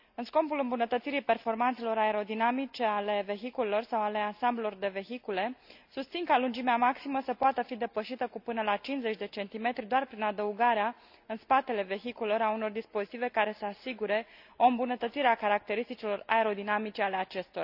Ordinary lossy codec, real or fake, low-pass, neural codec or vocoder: none; real; 5.4 kHz; none